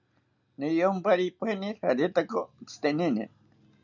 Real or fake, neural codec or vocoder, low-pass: real; none; 7.2 kHz